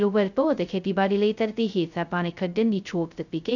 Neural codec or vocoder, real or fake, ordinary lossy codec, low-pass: codec, 16 kHz, 0.2 kbps, FocalCodec; fake; none; 7.2 kHz